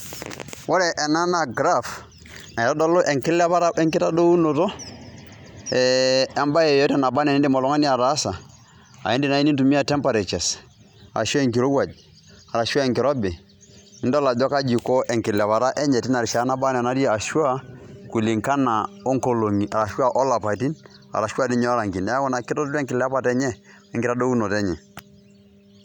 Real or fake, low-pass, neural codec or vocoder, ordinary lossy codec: real; 19.8 kHz; none; none